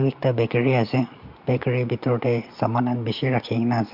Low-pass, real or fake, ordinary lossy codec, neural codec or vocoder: 5.4 kHz; real; MP3, 48 kbps; none